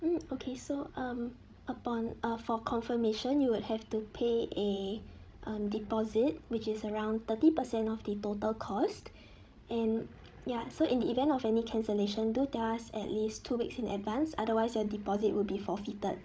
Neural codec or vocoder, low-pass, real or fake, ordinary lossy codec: codec, 16 kHz, 16 kbps, FreqCodec, larger model; none; fake; none